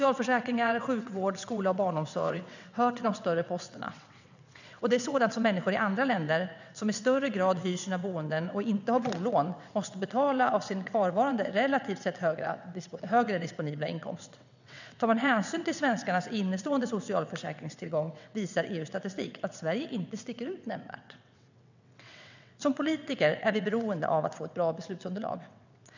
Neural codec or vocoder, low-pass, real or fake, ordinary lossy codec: vocoder, 22.05 kHz, 80 mel bands, WaveNeXt; 7.2 kHz; fake; none